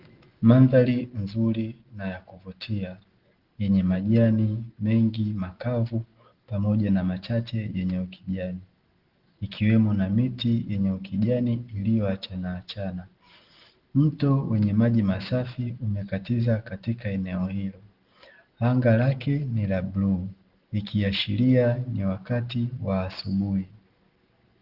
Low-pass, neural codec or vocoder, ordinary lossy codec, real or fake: 5.4 kHz; none; Opus, 16 kbps; real